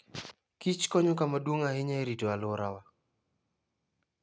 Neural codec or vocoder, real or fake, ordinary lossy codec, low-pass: none; real; none; none